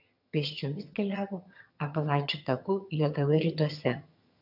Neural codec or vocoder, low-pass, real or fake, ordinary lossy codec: vocoder, 22.05 kHz, 80 mel bands, HiFi-GAN; 5.4 kHz; fake; AAC, 48 kbps